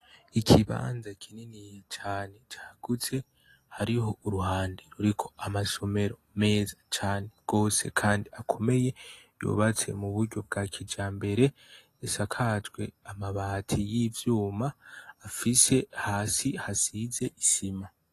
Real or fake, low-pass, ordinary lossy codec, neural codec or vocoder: real; 14.4 kHz; AAC, 48 kbps; none